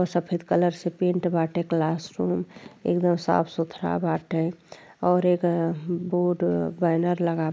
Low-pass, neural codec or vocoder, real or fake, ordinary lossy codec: none; none; real; none